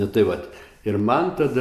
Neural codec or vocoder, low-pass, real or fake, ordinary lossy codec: none; 14.4 kHz; real; MP3, 96 kbps